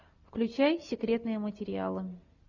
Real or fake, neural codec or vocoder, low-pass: real; none; 7.2 kHz